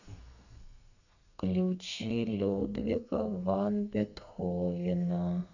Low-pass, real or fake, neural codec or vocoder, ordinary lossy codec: 7.2 kHz; fake; codec, 32 kHz, 1.9 kbps, SNAC; none